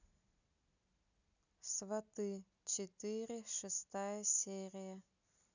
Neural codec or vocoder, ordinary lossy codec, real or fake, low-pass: none; none; real; 7.2 kHz